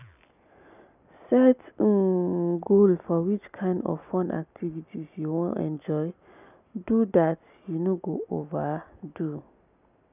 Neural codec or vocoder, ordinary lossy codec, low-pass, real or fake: none; none; 3.6 kHz; real